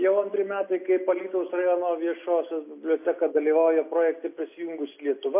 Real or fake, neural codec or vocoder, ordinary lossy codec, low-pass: real; none; MP3, 24 kbps; 3.6 kHz